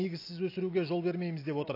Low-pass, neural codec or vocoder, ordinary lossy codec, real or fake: 5.4 kHz; none; AAC, 32 kbps; real